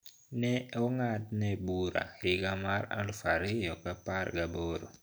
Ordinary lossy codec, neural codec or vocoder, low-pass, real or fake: none; none; none; real